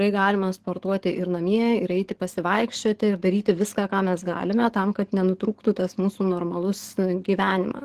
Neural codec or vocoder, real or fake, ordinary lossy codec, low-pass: codec, 44.1 kHz, 7.8 kbps, DAC; fake; Opus, 16 kbps; 14.4 kHz